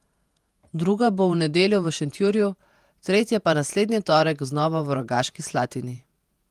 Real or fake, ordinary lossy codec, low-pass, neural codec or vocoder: fake; Opus, 32 kbps; 14.4 kHz; vocoder, 48 kHz, 128 mel bands, Vocos